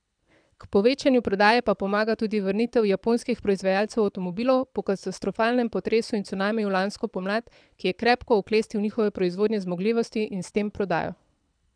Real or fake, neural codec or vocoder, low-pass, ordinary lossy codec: fake; codec, 24 kHz, 6 kbps, HILCodec; 9.9 kHz; none